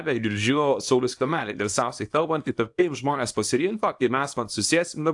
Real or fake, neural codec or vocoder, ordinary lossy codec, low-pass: fake; codec, 24 kHz, 0.9 kbps, WavTokenizer, small release; AAC, 64 kbps; 10.8 kHz